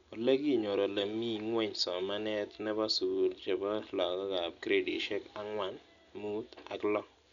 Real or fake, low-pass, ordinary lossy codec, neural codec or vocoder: real; 7.2 kHz; none; none